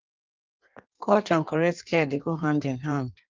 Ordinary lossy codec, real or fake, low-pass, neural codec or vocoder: Opus, 32 kbps; fake; 7.2 kHz; codec, 16 kHz in and 24 kHz out, 1.1 kbps, FireRedTTS-2 codec